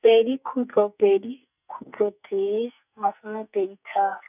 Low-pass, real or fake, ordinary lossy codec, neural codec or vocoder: 3.6 kHz; fake; none; codec, 44.1 kHz, 2.6 kbps, SNAC